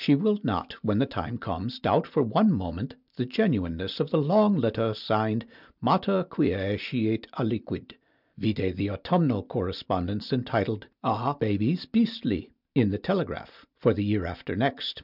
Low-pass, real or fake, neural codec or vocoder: 5.4 kHz; real; none